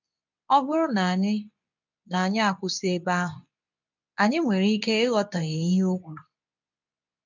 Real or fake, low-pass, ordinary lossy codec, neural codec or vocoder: fake; 7.2 kHz; none; codec, 24 kHz, 0.9 kbps, WavTokenizer, medium speech release version 2